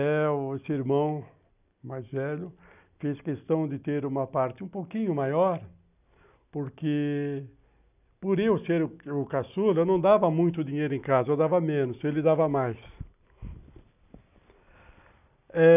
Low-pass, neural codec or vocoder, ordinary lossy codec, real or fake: 3.6 kHz; none; none; real